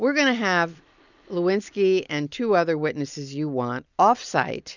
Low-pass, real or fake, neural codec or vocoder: 7.2 kHz; real; none